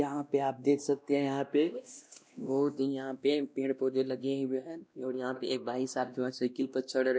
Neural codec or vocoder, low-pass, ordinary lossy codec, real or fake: codec, 16 kHz, 1 kbps, X-Codec, WavLM features, trained on Multilingual LibriSpeech; none; none; fake